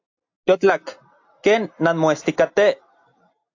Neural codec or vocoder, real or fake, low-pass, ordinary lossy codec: none; real; 7.2 kHz; AAC, 48 kbps